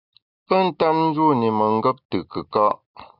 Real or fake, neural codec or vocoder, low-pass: real; none; 5.4 kHz